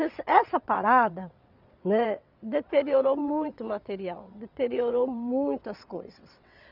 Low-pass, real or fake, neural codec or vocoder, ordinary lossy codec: 5.4 kHz; fake; vocoder, 22.05 kHz, 80 mel bands, WaveNeXt; none